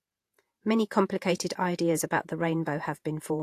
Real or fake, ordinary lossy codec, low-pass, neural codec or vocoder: fake; AAC, 64 kbps; 14.4 kHz; vocoder, 48 kHz, 128 mel bands, Vocos